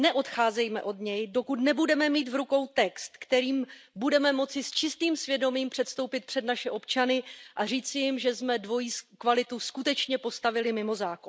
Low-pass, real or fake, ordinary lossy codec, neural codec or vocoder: none; real; none; none